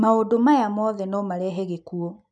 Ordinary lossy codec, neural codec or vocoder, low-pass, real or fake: none; none; 10.8 kHz; real